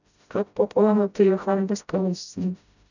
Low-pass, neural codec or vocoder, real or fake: 7.2 kHz; codec, 16 kHz, 0.5 kbps, FreqCodec, smaller model; fake